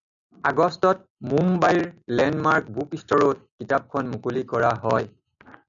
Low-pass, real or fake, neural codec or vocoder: 7.2 kHz; real; none